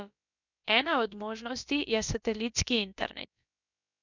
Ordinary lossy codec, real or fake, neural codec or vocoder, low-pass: none; fake; codec, 16 kHz, about 1 kbps, DyCAST, with the encoder's durations; 7.2 kHz